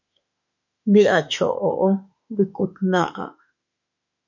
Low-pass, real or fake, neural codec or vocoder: 7.2 kHz; fake; autoencoder, 48 kHz, 32 numbers a frame, DAC-VAE, trained on Japanese speech